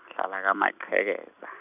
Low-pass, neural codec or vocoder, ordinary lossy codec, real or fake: 3.6 kHz; none; none; real